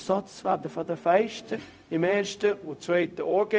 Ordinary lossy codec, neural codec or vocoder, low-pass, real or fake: none; codec, 16 kHz, 0.4 kbps, LongCat-Audio-Codec; none; fake